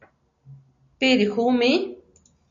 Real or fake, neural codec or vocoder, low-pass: real; none; 7.2 kHz